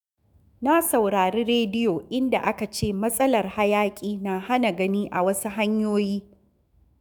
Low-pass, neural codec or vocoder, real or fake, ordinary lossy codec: none; autoencoder, 48 kHz, 128 numbers a frame, DAC-VAE, trained on Japanese speech; fake; none